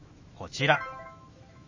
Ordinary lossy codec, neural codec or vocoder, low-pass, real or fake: MP3, 32 kbps; autoencoder, 48 kHz, 128 numbers a frame, DAC-VAE, trained on Japanese speech; 7.2 kHz; fake